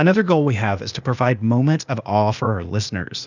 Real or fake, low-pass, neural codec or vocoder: fake; 7.2 kHz; codec, 16 kHz, 0.8 kbps, ZipCodec